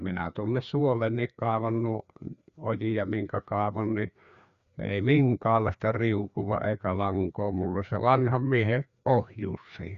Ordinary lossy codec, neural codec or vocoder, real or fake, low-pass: Opus, 64 kbps; codec, 16 kHz, 2 kbps, FreqCodec, larger model; fake; 7.2 kHz